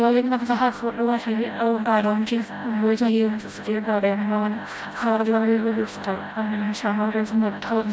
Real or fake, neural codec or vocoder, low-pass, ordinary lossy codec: fake; codec, 16 kHz, 0.5 kbps, FreqCodec, smaller model; none; none